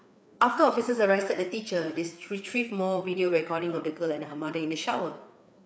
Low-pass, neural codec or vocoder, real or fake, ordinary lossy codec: none; codec, 16 kHz, 4 kbps, FreqCodec, larger model; fake; none